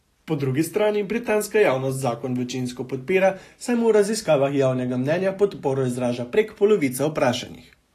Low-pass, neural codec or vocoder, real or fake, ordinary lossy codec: 14.4 kHz; none; real; AAC, 48 kbps